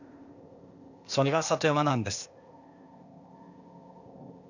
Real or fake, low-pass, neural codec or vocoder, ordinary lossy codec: fake; 7.2 kHz; codec, 16 kHz, 0.8 kbps, ZipCodec; none